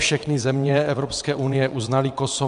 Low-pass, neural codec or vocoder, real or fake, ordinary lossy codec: 9.9 kHz; vocoder, 22.05 kHz, 80 mel bands, WaveNeXt; fake; MP3, 64 kbps